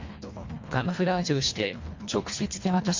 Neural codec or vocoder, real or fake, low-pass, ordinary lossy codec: codec, 24 kHz, 1.5 kbps, HILCodec; fake; 7.2 kHz; MP3, 48 kbps